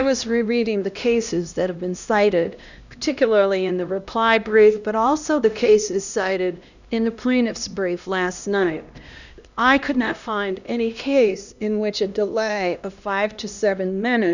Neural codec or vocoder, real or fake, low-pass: codec, 16 kHz, 1 kbps, X-Codec, HuBERT features, trained on LibriSpeech; fake; 7.2 kHz